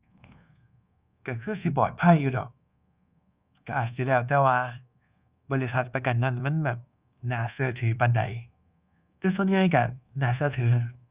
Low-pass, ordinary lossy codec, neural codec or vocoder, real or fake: 3.6 kHz; Opus, 64 kbps; codec, 24 kHz, 1.2 kbps, DualCodec; fake